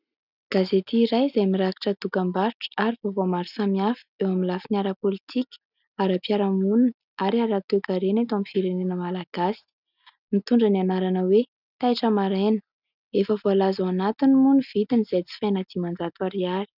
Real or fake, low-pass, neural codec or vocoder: real; 5.4 kHz; none